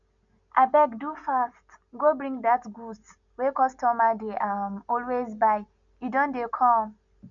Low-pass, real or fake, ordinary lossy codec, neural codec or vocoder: 7.2 kHz; real; none; none